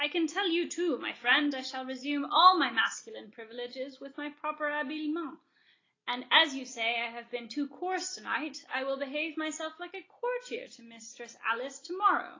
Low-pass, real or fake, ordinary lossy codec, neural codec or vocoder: 7.2 kHz; real; AAC, 32 kbps; none